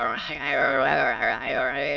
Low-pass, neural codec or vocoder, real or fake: 7.2 kHz; autoencoder, 22.05 kHz, a latent of 192 numbers a frame, VITS, trained on many speakers; fake